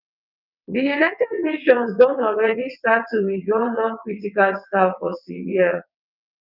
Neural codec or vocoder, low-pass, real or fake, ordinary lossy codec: vocoder, 22.05 kHz, 80 mel bands, WaveNeXt; 5.4 kHz; fake; none